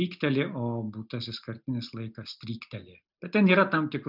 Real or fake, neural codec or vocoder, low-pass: real; none; 5.4 kHz